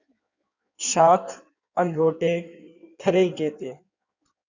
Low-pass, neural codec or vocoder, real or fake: 7.2 kHz; codec, 16 kHz in and 24 kHz out, 1.1 kbps, FireRedTTS-2 codec; fake